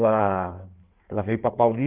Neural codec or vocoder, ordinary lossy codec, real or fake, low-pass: codec, 16 kHz in and 24 kHz out, 1.1 kbps, FireRedTTS-2 codec; Opus, 32 kbps; fake; 3.6 kHz